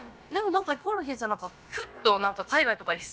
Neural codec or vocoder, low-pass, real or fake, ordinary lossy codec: codec, 16 kHz, about 1 kbps, DyCAST, with the encoder's durations; none; fake; none